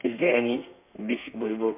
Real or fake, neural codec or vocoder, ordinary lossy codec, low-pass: fake; codec, 32 kHz, 1.9 kbps, SNAC; MP3, 32 kbps; 3.6 kHz